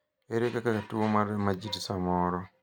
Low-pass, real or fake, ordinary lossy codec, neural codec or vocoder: 19.8 kHz; real; none; none